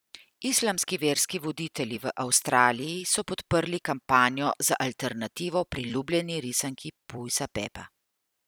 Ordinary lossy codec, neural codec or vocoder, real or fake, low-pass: none; vocoder, 44.1 kHz, 128 mel bands every 256 samples, BigVGAN v2; fake; none